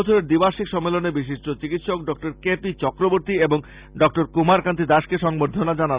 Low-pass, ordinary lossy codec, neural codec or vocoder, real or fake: 3.6 kHz; Opus, 64 kbps; none; real